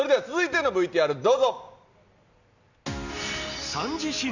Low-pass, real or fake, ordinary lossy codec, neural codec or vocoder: 7.2 kHz; real; none; none